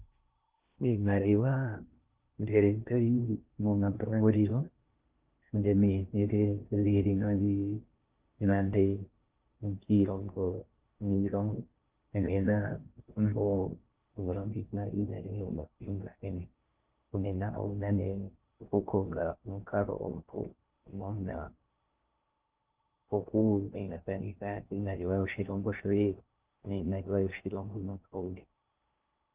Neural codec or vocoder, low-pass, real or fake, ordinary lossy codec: codec, 16 kHz in and 24 kHz out, 0.6 kbps, FocalCodec, streaming, 2048 codes; 3.6 kHz; fake; Opus, 24 kbps